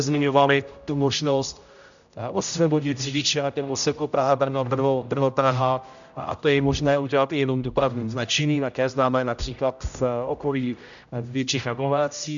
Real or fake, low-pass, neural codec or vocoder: fake; 7.2 kHz; codec, 16 kHz, 0.5 kbps, X-Codec, HuBERT features, trained on general audio